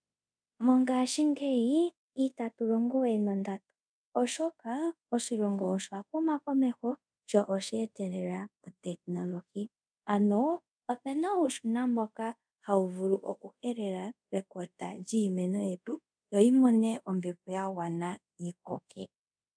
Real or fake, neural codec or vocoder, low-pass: fake; codec, 24 kHz, 0.5 kbps, DualCodec; 9.9 kHz